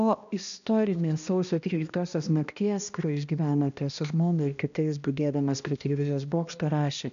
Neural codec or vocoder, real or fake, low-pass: codec, 16 kHz, 1 kbps, X-Codec, HuBERT features, trained on balanced general audio; fake; 7.2 kHz